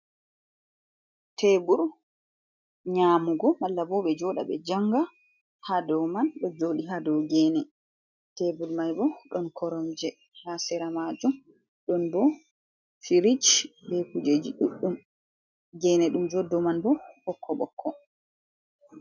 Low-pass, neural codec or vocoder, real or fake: 7.2 kHz; none; real